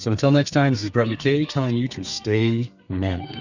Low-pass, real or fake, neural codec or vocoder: 7.2 kHz; fake; codec, 32 kHz, 1.9 kbps, SNAC